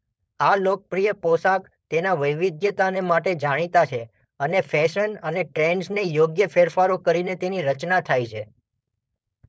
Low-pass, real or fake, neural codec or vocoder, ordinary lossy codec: none; fake; codec, 16 kHz, 4.8 kbps, FACodec; none